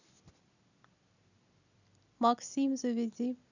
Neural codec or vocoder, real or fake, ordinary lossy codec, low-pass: none; real; none; 7.2 kHz